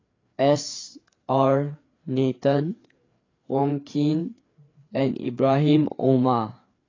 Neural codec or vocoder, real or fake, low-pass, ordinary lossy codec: codec, 16 kHz, 4 kbps, FreqCodec, larger model; fake; 7.2 kHz; AAC, 32 kbps